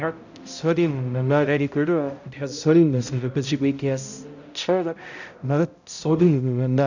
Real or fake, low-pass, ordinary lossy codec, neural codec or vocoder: fake; 7.2 kHz; none; codec, 16 kHz, 0.5 kbps, X-Codec, HuBERT features, trained on balanced general audio